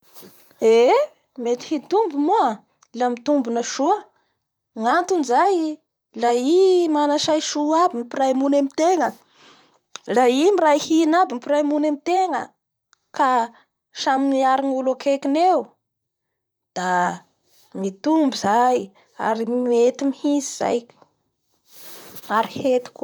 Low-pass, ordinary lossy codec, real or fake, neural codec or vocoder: none; none; fake; vocoder, 44.1 kHz, 128 mel bands, Pupu-Vocoder